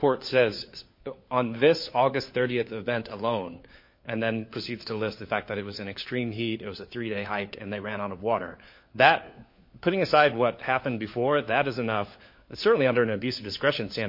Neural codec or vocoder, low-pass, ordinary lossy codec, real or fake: codec, 16 kHz, 4 kbps, FunCodec, trained on LibriTTS, 50 frames a second; 5.4 kHz; MP3, 32 kbps; fake